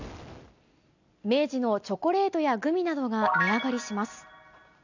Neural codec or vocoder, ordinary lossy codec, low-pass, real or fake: none; none; 7.2 kHz; real